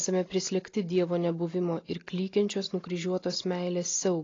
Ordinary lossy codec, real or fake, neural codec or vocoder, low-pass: AAC, 32 kbps; real; none; 7.2 kHz